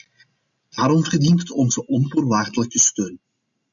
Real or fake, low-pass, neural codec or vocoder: real; 7.2 kHz; none